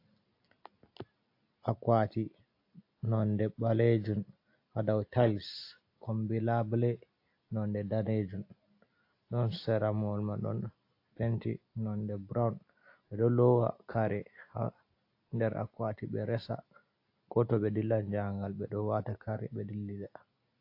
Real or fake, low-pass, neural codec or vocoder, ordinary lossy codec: real; 5.4 kHz; none; AAC, 32 kbps